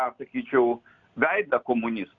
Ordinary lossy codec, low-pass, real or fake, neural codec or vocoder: MP3, 96 kbps; 7.2 kHz; real; none